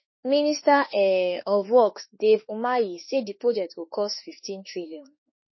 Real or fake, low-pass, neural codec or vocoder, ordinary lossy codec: fake; 7.2 kHz; autoencoder, 48 kHz, 32 numbers a frame, DAC-VAE, trained on Japanese speech; MP3, 24 kbps